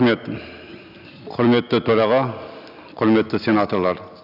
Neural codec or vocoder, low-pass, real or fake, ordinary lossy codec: none; 5.4 kHz; real; none